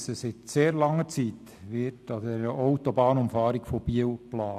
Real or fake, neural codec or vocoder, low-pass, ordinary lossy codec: real; none; 14.4 kHz; none